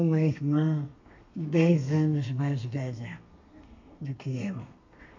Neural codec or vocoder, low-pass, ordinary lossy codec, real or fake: codec, 32 kHz, 1.9 kbps, SNAC; 7.2 kHz; MP3, 64 kbps; fake